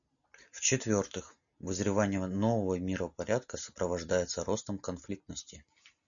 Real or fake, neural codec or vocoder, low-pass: real; none; 7.2 kHz